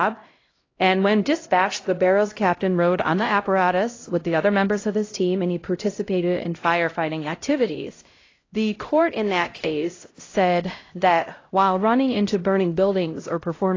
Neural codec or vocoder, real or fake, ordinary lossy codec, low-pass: codec, 16 kHz, 0.5 kbps, X-Codec, HuBERT features, trained on LibriSpeech; fake; AAC, 32 kbps; 7.2 kHz